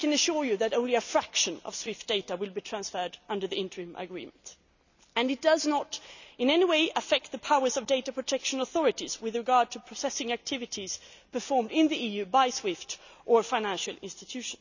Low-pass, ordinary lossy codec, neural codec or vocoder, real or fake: 7.2 kHz; none; none; real